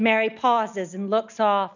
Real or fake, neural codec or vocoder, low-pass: real; none; 7.2 kHz